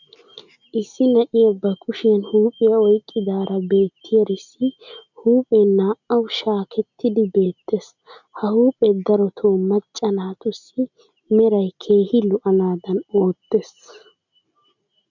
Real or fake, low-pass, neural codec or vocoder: real; 7.2 kHz; none